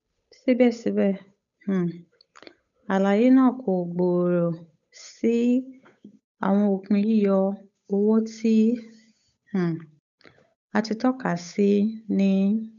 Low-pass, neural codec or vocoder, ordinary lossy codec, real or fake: 7.2 kHz; codec, 16 kHz, 8 kbps, FunCodec, trained on Chinese and English, 25 frames a second; none; fake